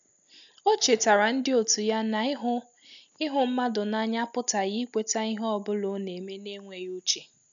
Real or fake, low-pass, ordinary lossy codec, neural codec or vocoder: real; 7.2 kHz; none; none